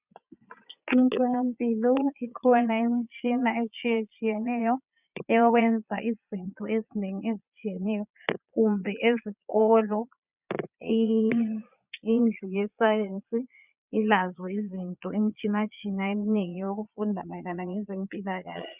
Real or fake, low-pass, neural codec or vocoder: fake; 3.6 kHz; vocoder, 22.05 kHz, 80 mel bands, Vocos